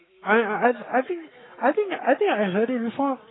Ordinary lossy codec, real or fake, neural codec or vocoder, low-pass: AAC, 16 kbps; fake; codec, 44.1 kHz, 7.8 kbps, Pupu-Codec; 7.2 kHz